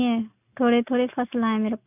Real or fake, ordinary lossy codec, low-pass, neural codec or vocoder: real; none; 3.6 kHz; none